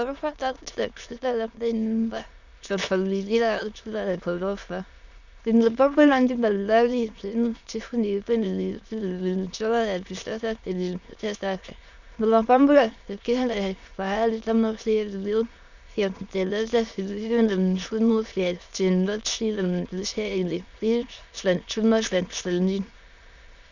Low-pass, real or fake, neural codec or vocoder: 7.2 kHz; fake; autoencoder, 22.05 kHz, a latent of 192 numbers a frame, VITS, trained on many speakers